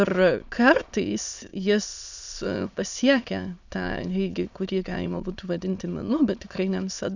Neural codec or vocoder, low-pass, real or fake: autoencoder, 22.05 kHz, a latent of 192 numbers a frame, VITS, trained on many speakers; 7.2 kHz; fake